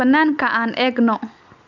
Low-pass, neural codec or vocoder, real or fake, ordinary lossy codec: 7.2 kHz; none; real; none